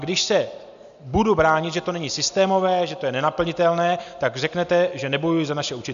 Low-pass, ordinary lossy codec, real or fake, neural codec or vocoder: 7.2 kHz; AAC, 96 kbps; real; none